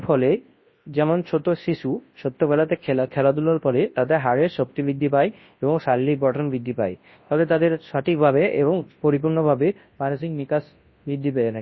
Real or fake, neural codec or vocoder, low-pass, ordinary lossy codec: fake; codec, 24 kHz, 0.9 kbps, WavTokenizer, large speech release; 7.2 kHz; MP3, 24 kbps